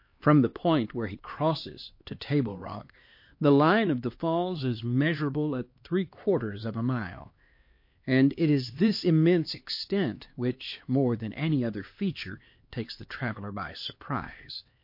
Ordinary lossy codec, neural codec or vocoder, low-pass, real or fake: MP3, 32 kbps; codec, 16 kHz, 2 kbps, X-Codec, HuBERT features, trained on LibriSpeech; 5.4 kHz; fake